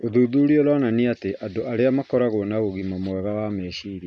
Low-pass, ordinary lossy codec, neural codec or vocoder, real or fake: none; none; none; real